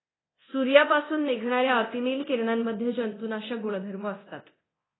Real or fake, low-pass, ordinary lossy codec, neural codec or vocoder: fake; 7.2 kHz; AAC, 16 kbps; codec, 24 kHz, 0.9 kbps, DualCodec